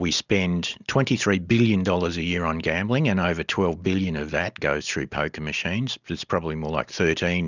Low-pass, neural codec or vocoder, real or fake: 7.2 kHz; none; real